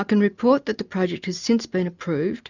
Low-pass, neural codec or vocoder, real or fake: 7.2 kHz; none; real